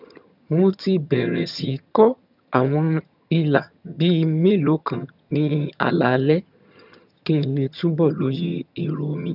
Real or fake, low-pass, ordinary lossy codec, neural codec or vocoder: fake; 5.4 kHz; none; vocoder, 22.05 kHz, 80 mel bands, HiFi-GAN